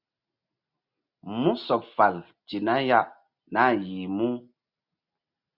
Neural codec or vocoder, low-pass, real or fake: none; 5.4 kHz; real